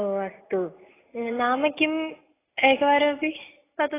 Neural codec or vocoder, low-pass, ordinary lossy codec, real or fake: none; 3.6 kHz; AAC, 16 kbps; real